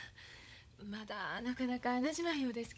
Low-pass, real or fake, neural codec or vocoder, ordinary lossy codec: none; fake; codec, 16 kHz, 16 kbps, FunCodec, trained on LibriTTS, 50 frames a second; none